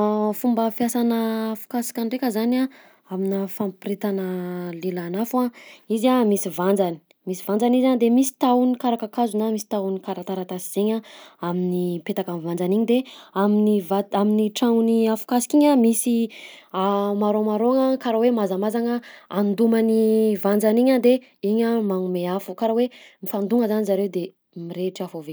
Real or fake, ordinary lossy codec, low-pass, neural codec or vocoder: real; none; none; none